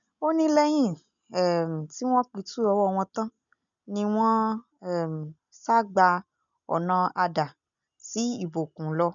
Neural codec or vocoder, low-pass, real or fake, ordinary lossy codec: none; 7.2 kHz; real; none